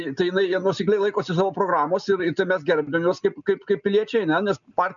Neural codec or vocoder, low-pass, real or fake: none; 7.2 kHz; real